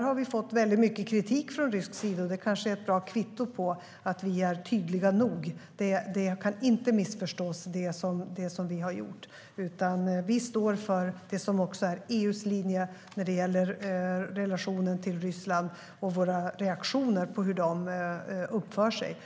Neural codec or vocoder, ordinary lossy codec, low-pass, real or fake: none; none; none; real